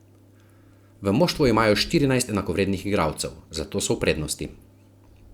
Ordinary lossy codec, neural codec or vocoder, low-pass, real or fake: Opus, 64 kbps; none; 19.8 kHz; real